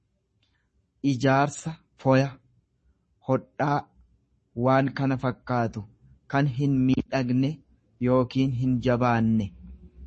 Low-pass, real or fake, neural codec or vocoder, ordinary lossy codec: 10.8 kHz; fake; codec, 44.1 kHz, 7.8 kbps, Pupu-Codec; MP3, 32 kbps